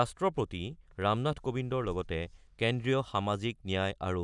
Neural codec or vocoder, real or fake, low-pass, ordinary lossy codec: none; real; 10.8 kHz; none